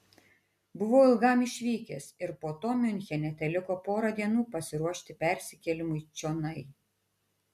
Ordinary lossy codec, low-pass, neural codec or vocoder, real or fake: MP3, 96 kbps; 14.4 kHz; none; real